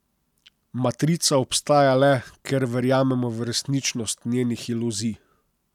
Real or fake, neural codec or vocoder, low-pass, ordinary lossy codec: real; none; 19.8 kHz; none